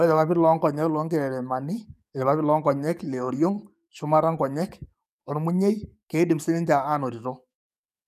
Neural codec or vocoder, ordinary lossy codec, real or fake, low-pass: codec, 44.1 kHz, 7.8 kbps, DAC; none; fake; 14.4 kHz